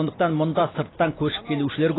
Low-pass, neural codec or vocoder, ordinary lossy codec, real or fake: 7.2 kHz; none; AAC, 16 kbps; real